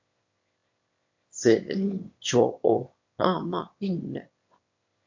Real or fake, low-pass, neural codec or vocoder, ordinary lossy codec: fake; 7.2 kHz; autoencoder, 22.05 kHz, a latent of 192 numbers a frame, VITS, trained on one speaker; MP3, 48 kbps